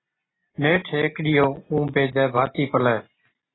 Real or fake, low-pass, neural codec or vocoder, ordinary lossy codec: real; 7.2 kHz; none; AAC, 16 kbps